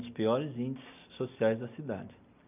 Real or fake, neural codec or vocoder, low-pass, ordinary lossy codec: real; none; 3.6 kHz; none